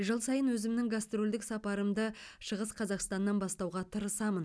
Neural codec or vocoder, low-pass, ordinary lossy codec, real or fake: none; none; none; real